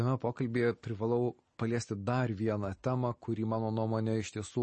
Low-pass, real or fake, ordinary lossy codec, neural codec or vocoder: 10.8 kHz; real; MP3, 32 kbps; none